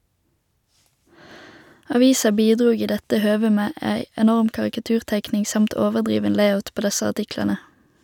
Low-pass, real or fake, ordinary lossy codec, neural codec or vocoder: 19.8 kHz; real; none; none